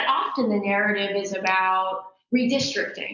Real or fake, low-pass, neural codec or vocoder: real; 7.2 kHz; none